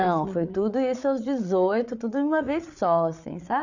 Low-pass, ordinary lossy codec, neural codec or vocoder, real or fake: 7.2 kHz; none; codec, 16 kHz, 8 kbps, FreqCodec, larger model; fake